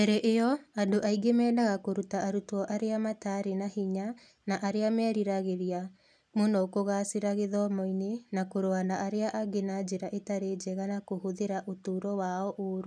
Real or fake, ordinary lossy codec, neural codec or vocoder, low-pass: real; none; none; none